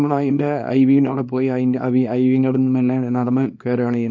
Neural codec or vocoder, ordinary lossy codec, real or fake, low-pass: codec, 24 kHz, 0.9 kbps, WavTokenizer, small release; MP3, 48 kbps; fake; 7.2 kHz